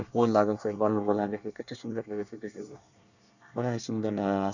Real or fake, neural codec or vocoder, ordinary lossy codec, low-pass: fake; codec, 24 kHz, 1 kbps, SNAC; none; 7.2 kHz